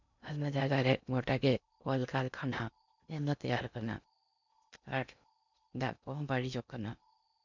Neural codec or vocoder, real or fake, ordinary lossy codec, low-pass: codec, 16 kHz in and 24 kHz out, 0.6 kbps, FocalCodec, streaming, 2048 codes; fake; none; 7.2 kHz